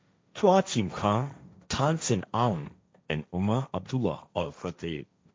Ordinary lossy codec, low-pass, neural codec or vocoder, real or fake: none; none; codec, 16 kHz, 1.1 kbps, Voila-Tokenizer; fake